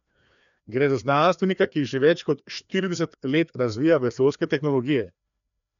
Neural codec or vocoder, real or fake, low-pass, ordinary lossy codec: codec, 16 kHz, 2 kbps, FreqCodec, larger model; fake; 7.2 kHz; none